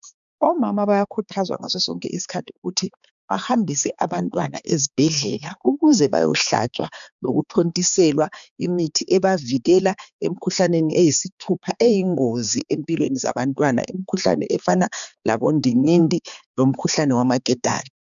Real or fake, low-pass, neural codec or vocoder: fake; 7.2 kHz; codec, 16 kHz, 4 kbps, X-Codec, HuBERT features, trained on balanced general audio